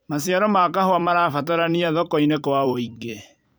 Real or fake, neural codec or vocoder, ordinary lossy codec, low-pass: fake; vocoder, 44.1 kHz, 128 mel bands every 256 samples, BigVGAN v2; none; none